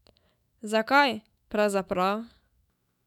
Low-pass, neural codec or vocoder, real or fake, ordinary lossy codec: 19.8 kHz; autoencoder, 48 kHz, 128 numbers a frame, DAC-VAE, trained on Japanese speech; fake; none